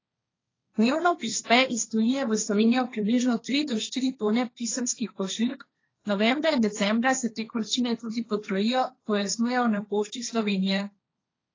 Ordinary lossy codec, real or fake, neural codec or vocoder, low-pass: AAC, 32 kbps; fake; codec, 16 kHz, 1.1 kbps, Voila-Tokenizer; 7.2 kHz